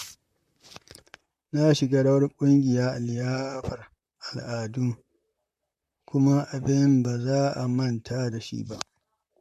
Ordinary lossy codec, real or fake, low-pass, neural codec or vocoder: MP3, 64 kbps; fake; 14.4 kHz; vocoder, 44.1 kHz, 128 mel bands, Pupu-Vocoder